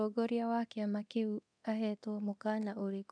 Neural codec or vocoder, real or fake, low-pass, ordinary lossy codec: codec, 24 kHz, 0.9 kbps, DualCodec; fake; 9.9 kHz; none